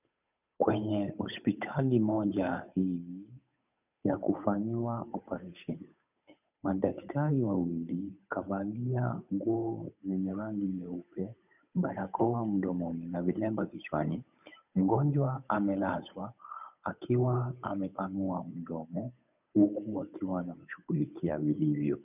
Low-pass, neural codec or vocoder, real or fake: 3.6 kHz; codec, 16 kHz, 8 kbps, FunCodec, trained on Chinese and English, 25 frames a second; fake